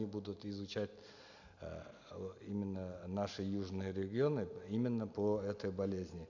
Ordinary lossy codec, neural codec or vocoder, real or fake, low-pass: none; none; real; 7.2 kHz